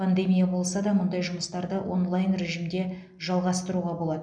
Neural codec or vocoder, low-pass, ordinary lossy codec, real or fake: none; 9.9 kHz; none; real